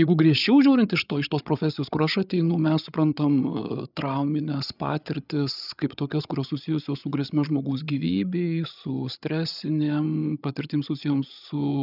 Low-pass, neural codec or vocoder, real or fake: 5.4 kHz; codec, 16 kHz, 8 kbps, FreqCodec, larger model; fake